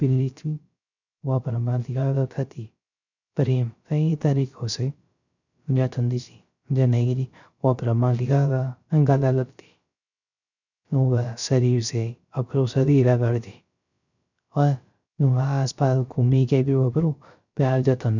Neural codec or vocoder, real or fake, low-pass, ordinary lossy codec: codec, 16 kHz, 0.3 kbps, FocalCodec; fake; 7.2 kHz; none